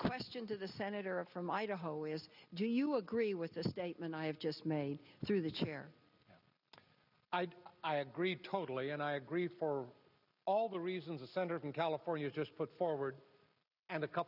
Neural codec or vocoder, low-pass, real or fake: none; 5.4 kHz; real